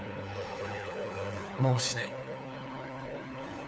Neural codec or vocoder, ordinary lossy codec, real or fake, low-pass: codec, 16 kHz, 4 kbps, FunCodec, trained on LibriTTS, 50 frames a second; none; fake; none